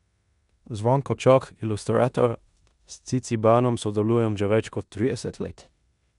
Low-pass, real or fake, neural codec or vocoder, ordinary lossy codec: 10.8 kHz; fake; codec, 16 kHz in and 24 kHz out, 0.9 kbps, LongCat-Audio-Codec, four codebook decoder; none